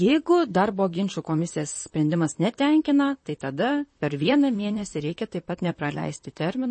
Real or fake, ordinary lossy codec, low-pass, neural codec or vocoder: fake; MP3, 32 kbps; 9.9 kHz; vocoder, 44.1 kHz, 128 mel bands, Pupu-Vocoder